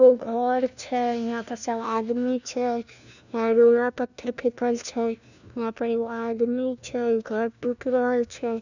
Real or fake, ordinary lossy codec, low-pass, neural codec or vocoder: fake; none; 7.2 kHz; codec, 16 kHz, 1 kbps, FunCodec, trained on LibriTTS, 50 frames a second